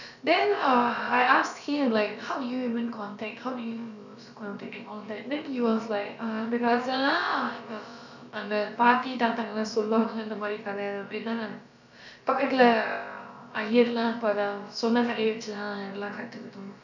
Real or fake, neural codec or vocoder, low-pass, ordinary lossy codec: fake; codec, 16 kHz, about 1 kbps, DyCAST, with the encoder's durations; 7.2 kHz; none